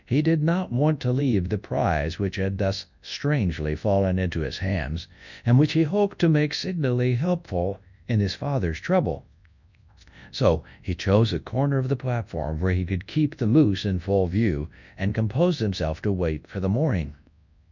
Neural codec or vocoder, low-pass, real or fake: codec, 24 kHz, 0.9 kbps, WavTokenizer, large speech release; 7.2 kHz; fake